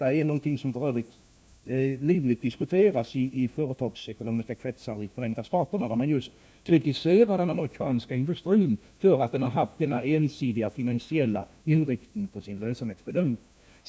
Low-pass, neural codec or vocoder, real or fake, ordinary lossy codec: none; codec, 16 kHz, 1 kbps, FunCodec, trained on LibriTTS, 50 frames a second; fake; none